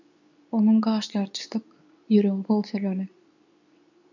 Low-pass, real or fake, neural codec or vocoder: 7.2 kHz; fake; codec, 24 kHz, 0.9 kbps, WavTokenizer, medium speech release version 2